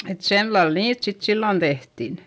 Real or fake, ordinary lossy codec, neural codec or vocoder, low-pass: real; none; none; none